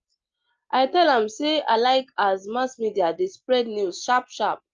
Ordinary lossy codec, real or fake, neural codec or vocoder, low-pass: Opus, 24 kbps; real; none; 7.2 kHz